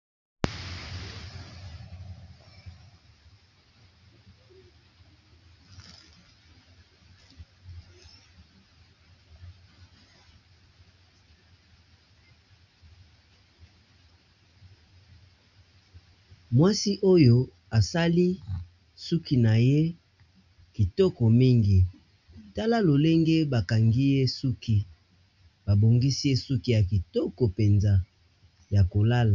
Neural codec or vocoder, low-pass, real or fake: none; 7.2 kHz; real